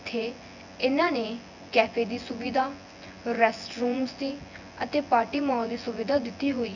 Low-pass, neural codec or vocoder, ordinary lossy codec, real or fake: 7.2 kHz; vocoder, 24 kHz, 100 mel bands, Vocos; none; fake